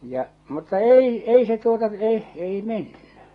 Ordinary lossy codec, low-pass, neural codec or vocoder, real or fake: AAC, 32 kbps; 10.8 kHz; none; real